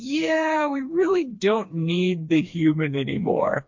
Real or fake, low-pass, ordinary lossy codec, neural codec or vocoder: fake; 7.2 kHz; MP3, 48 kbps; codec, 16 kHz, 2 kbps, FreqCodec, smaller model